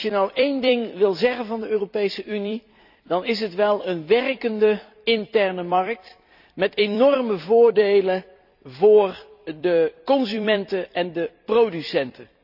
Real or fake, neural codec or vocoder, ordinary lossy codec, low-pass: fake; vocoder, 44.1 kHz, 128 mel bands every 256 samples, BigVGAN v2; none; 5.4 kHz